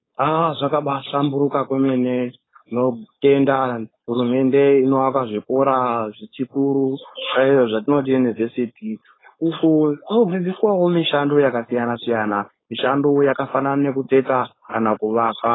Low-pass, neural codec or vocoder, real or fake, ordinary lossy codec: 7.2 kHz; codec, 16 kHz, 4.8 kbps, FACodec; fake; AAC, 16 kbps